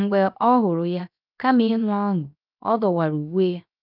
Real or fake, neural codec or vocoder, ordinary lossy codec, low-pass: fake; codec, 16 kHz, 0.7 kbps, FocalCodec; none; 5.4 kHz